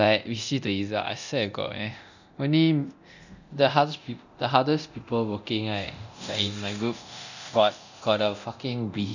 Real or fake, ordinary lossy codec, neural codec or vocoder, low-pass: fake; none; codec, 24 kHz, 0.9 kbps, DualCodec; 7.2 kHz